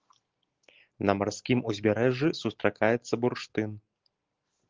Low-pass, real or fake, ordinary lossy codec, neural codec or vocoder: 7.2 kHz; real; Opus, 16 kbps; none